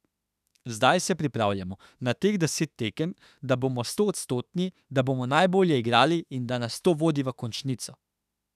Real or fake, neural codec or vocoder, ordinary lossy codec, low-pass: fake; autoencoder, 48 kHz, 32 numbers a frame, DAC-VAE, trained on Japanese speech; none; 14.4 kHz